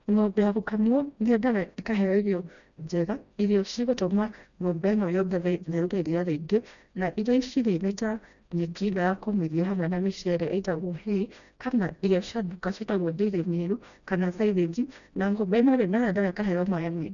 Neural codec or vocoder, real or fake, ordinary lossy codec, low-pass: codec, 16 kHz, 1 kbps, FreqCodec, smaller model; fake; none; 7.2 kHz